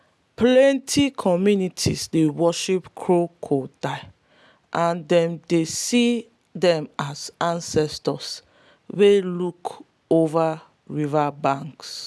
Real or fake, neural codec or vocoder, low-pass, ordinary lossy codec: real; none; none; none